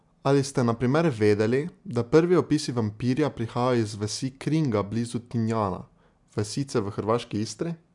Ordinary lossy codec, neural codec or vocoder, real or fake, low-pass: MP3, 96 kbps; none; real; 10.8 kHz